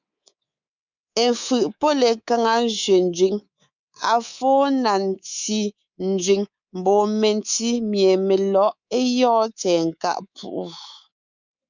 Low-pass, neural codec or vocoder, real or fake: 7.2 kHz; codec, 24 kHz, 3.1 kbps, DualCodec; fake